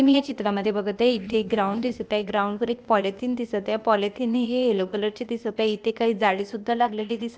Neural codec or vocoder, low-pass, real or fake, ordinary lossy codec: codec, 16 kHz, 0.8 kbps, ZipCodec; none; fake; none